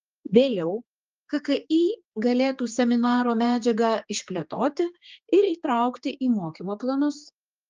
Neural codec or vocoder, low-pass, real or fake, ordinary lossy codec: codec, 16 kHz, 4 kbps, X-Codec, HuBERT features, trained on general audio; 7.2 kHz; fake; Opus, 24 kbps